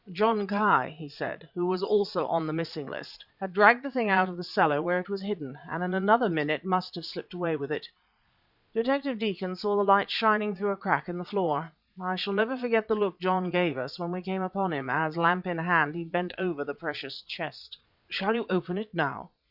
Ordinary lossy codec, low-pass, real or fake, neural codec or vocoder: Opus, 64 kbps; 5.4 kHz; fake; vocoder, 22.05 kHz, 80 mel bands, Vocos